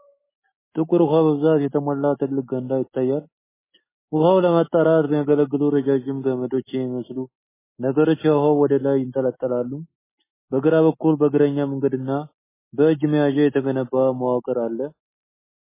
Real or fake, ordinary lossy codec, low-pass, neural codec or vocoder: real; MP3, 16 kbps; 3.6 kHz; none